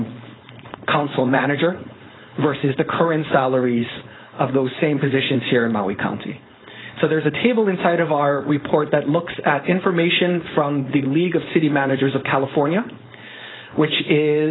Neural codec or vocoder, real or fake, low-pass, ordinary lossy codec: codec, 16 kHz, 4.8 kbps, FACodec; fake; 7.2 kHz; AAC, 16 kbps